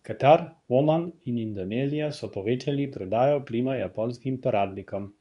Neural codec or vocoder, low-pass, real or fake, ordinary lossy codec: codec, 24 kHz, 0.9 kbps, WavTokenizer, medium speech release version 2; 10.8 kHz; fake; none